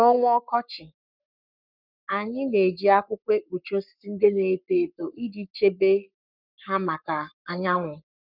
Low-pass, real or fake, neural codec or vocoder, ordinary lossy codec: 5.4 kHz; fake; codec, 44.1 kHz, 7.8 kbps, Pupu-Codec; none